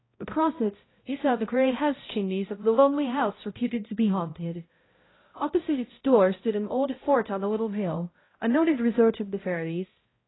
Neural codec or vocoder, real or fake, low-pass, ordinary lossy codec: codec, 16 kHz, 0.5 kbps, X-Codec, HuBERT features, trained on balanced general audio; fake; 7.2 kHz; AAC, 16 kbps